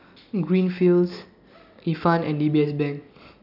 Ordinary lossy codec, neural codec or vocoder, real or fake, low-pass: none; none; real; 5.4 kHz